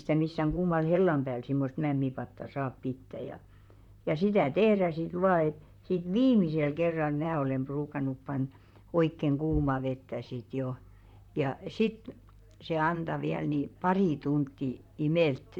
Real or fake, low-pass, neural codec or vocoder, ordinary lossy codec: fake; 19.8 kHz; vocoder, 44.1 kHz, 128 mel bands, Pupu-Vocoder; none